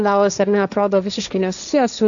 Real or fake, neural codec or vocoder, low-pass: fake; codec, 16 kHz, 1.1 kbps, Voila-Tokenizer; 7.2 kHz